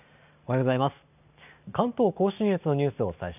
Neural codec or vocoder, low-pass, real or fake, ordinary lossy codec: none; 3.6 kHz; real; none